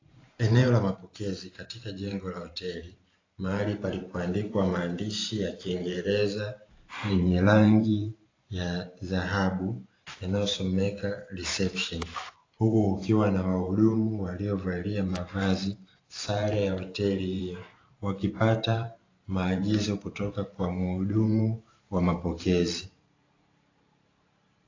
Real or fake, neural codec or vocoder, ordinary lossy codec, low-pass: fake; vocoder, 44.1 kHz, 128 mel bands every 512 samples, BigVGAN v2; AAC, 32 kbps; 7.2 kHz